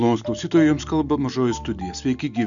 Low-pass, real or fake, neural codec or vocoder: 7.2 kHz; real; none